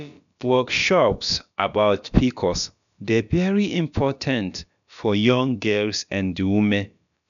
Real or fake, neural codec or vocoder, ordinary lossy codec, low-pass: fake; codec, 16 kHz, about 1 kbps, DyCAST, with the encoder's durations; none; 7.2 kHz